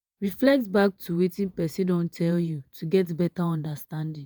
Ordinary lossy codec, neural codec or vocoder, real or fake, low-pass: none; vocoder, 48 kHz, 128 mel bands, Vocos; fake; none